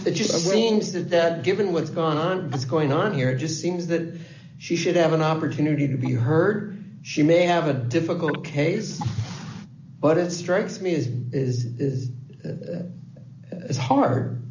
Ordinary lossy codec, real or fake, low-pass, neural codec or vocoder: AAC, 48 kbps; real; 7.2 kHz; none